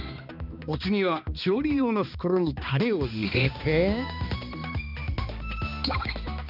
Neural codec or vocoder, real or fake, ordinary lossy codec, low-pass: codec, 16 kHz, 2 kbps, X-Codec, HuBERT features, trained on balanced general audio; fake; none; 5.4 kHz